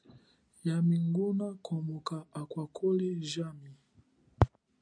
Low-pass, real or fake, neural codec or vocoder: 9.9 kHz; real; none